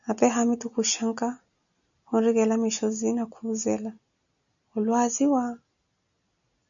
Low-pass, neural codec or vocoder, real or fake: 7.2 kHz; none; real